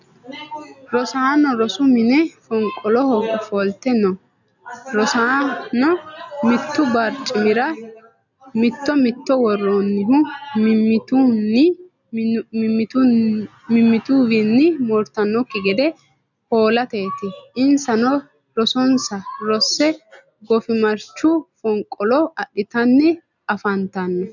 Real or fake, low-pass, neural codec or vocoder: real; 7.2 kHz; none